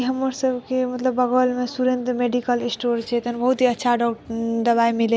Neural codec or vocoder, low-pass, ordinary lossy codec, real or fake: none; none; none; real